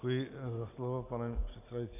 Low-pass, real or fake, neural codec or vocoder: 3.6 kHz; real; none